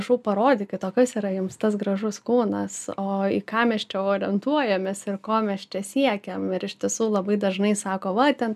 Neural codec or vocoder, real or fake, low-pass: none; real; 14.4 kHz